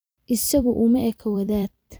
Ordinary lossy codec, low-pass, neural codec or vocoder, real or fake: none; none; vocoder, 44.1 kHz, 128 mel bands every 256 samples, BigVGAN v2; fake